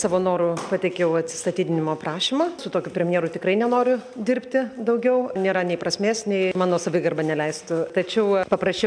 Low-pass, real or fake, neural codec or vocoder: 9.9 kHz; real; none